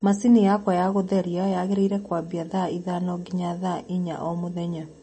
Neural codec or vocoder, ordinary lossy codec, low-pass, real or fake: none; MP3, 32 kbps; 9.9 kHz; real